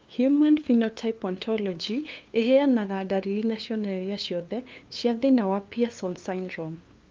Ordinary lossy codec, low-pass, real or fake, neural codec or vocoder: Opus, 24 kbps; 7.2 kHz; fake; codec, 16 kHz, 2 kbps, FunCodec, trained on LibriTTS, 25 frames a second